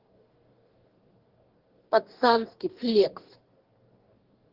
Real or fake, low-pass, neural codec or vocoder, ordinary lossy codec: fake; 5.4 kHz; codec, 44.1 kHz, 2.6 kbps, DAC; Opus, 16 kbps